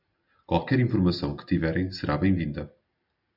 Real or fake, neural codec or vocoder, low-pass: real; none; 5.4 kHz